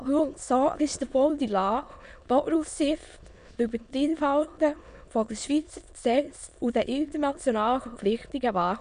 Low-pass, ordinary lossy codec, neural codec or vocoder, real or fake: 9.9 kHz; none; autoencoder, 22.05 kHz, a latent of 192 numbers a frame, VITS, trained on many speakers; fake